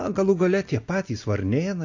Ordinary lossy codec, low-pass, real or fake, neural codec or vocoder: AAC, 48 kbps; 7.2 kHz; real; none